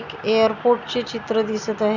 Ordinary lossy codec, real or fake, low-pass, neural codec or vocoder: none; real; 7.2 kHz; none